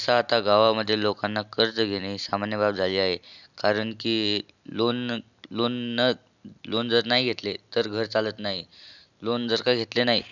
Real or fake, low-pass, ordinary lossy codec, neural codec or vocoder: real; 7.2 kHz; none; none